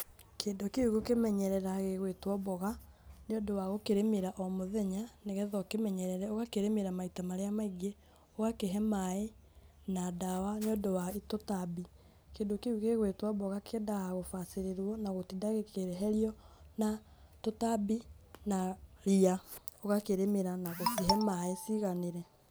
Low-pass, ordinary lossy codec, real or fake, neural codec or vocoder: none; none; real; none